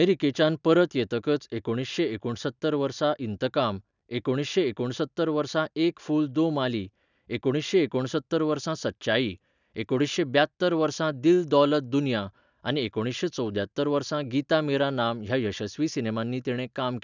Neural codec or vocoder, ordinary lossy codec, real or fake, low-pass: none; none; real; 7.2 kHz